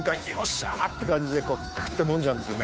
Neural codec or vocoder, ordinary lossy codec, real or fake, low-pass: codec, 16 kHz, 2 kbps, FunCodec, trained on Chinese and English, 25 frames a second; none; fake; none